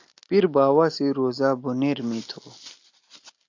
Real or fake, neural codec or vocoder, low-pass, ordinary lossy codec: real; none; 7.2 kHz; AAC, 48 kbps